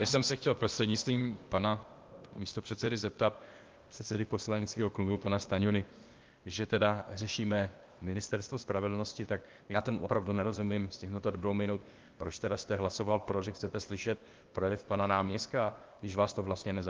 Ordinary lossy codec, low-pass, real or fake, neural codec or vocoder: Opus, 32 kbps; 7.2 kHz; fake; codec, 16 kHz, 0.8 kbps, ZipCodec